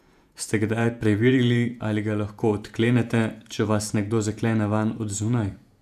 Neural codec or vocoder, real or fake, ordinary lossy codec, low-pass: vocoder, 48 kHz, 128 mel bands, Vocos; fake; none; 14.4 kHz